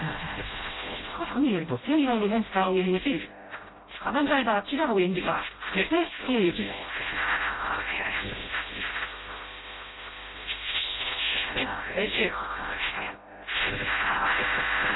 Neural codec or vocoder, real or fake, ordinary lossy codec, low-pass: codec, 16 kHz, 0.5 kbps, FreqCodec, smaller model; fake; AAC, 16 kbps; 7.2 kHz